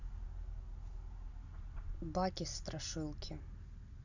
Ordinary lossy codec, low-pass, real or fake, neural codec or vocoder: none; 7.2 kHz; real; none